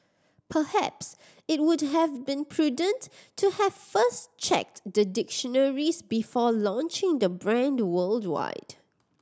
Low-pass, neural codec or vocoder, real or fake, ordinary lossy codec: none; none; real; none